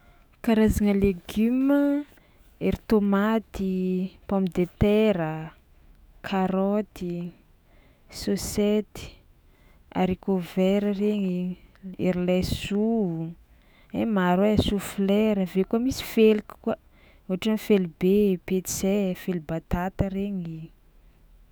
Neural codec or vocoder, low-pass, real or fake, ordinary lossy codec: autoencoder, 48 kHz, 128 numbers a frame, DAC-VAE, trained on Japanese speech; none; fake; none